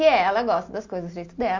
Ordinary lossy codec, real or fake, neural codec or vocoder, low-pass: MP3, 48 kbps; real; none; 7.2 kHz